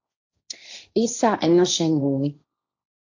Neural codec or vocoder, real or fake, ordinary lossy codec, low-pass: codec, 16 kHz, 1.1 kbps, Voila-Tokenizer; fake; AAC, 48 kbps; 7.2 kHz